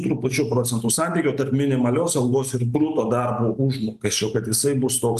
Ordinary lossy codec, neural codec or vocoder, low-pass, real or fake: Opus, 32 kbps; none; 14.4 kHz; real